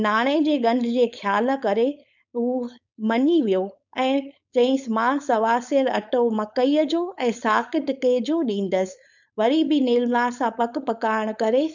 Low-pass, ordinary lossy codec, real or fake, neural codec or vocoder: 7.2 kHz; none; fake; codec, 16 kHz, 4.8 kbps, FACodec